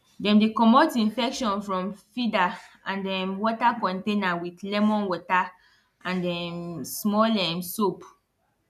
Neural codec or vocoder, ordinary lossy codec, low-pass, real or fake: none; none; 14.4 kHz; real